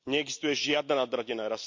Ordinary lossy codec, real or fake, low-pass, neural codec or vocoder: MP3, 48 kbps; real; 7.2 kHz; none